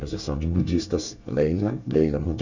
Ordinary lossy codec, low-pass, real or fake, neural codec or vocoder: none; 7.2 kHz; fake; codec, 24 kHz, 1 kbps, SNAC